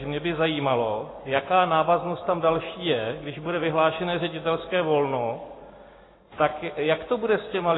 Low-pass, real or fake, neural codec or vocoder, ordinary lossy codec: 7.2 kHz; real; none; AAC, 16 kbps